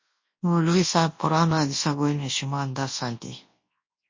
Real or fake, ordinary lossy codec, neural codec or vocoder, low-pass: fake; MP3, 32 kbps; codec, 24 kHz, 0.9 kbps, WavTokenizer, large speech release; 7.2 kHz